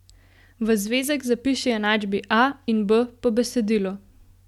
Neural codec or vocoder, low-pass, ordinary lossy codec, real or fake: none; 19.8 kHz; none; real